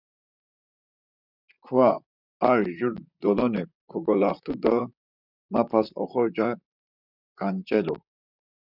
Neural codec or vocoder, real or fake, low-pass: vocoder, 44.1 kHz, 128 mel bands, Pupu-Vocoder; fake; 5.4 kHz